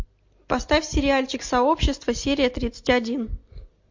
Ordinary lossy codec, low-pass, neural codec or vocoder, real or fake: MP3, 48 kbps; 7.2 kHz; none; real